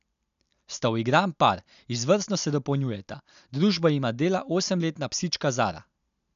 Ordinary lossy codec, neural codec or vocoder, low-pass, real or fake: none; none; 7.2 kHz; real